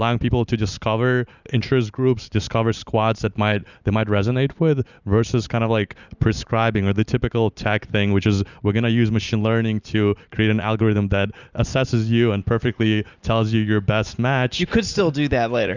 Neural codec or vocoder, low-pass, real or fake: none; 7.2 kHz; real